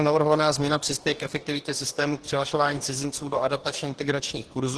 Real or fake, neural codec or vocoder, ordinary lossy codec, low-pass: fake; codec, 44.1 kHz, 2.6 kbps, DAC; Opus, 16 kbps; 10.8 kHz